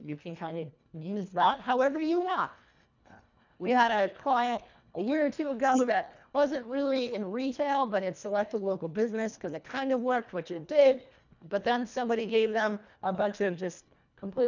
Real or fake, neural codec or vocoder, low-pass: fake; codec, 24 kHz, 1.5 kbps, HILCodec; 7.2 kHz